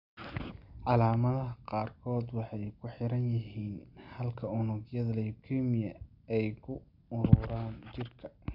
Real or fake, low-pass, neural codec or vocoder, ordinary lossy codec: real; 5.4 kHz; none; none